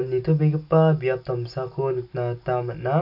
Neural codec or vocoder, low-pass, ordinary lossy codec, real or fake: none; 5.4 kHz; none; real